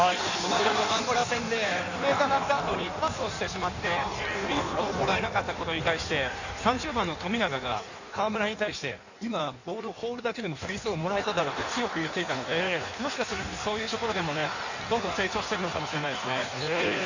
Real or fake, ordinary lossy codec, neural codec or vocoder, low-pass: fake; none; codec, 16 kHz in and 24 kHz out, 1.1 kbps, FireRedTTS-2 codec; 7.2 kHz